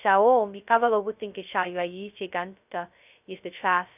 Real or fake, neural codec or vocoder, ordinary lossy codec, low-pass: fake; codec, 16 kHz, 0.2 kbps, FocalCodec; none; 3.6 kHz